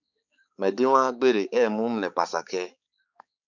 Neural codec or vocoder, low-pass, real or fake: codec, 16 kHz, 4 kbps, X-Codec, HuBERT features, trained on balanced general audio; 7.2 kHz; fake